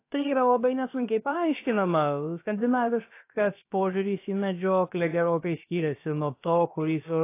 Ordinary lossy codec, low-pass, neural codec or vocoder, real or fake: AAC, 24 kbps; 3.6 kHz; codec, 16 kHz, about 1 kbps, DyCAST, with the encoder's durations; fake